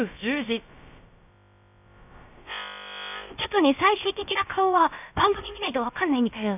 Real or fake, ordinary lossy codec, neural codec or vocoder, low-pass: fake; none; codec, 16 kHz, about 1 kbps, DyCAST, with the encoder's durations; 3.6 kHz